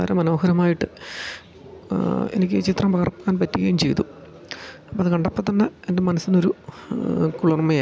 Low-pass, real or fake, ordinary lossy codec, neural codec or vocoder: none; real; none; none